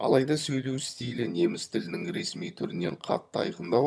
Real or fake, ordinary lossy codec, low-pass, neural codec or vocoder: fake; none; none; vocoder, 22.05 kHz, 80 mel bands, HiFi-GAN